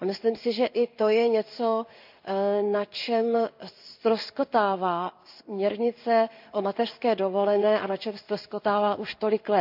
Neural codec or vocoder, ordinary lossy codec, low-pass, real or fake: codec, 16 kHz in and 24 kHz out, 1 kbps, XY-Tokenizer; none; 5.4 kHz; fake